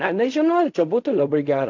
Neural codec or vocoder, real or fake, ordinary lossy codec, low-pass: codec, 16 kHz in and 24 kHz out, 0.4 kbps, LongCat-Audio-Codec, fine tuned four codebook decoder; fake; AAC, 48 kbps; 7.2 kHz